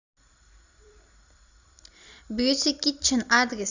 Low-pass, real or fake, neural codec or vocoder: 7.2 kHz; fake; vocoder, 44.1 kHz, 128 mel bands every 256 samples, BigVGAN v2